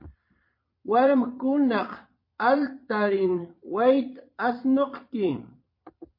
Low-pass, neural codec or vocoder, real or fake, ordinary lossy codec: 5.4 kHz; vocoder, 22.05 kHz, 80 mel bands, Vocos; fake; MP3, 32 kbps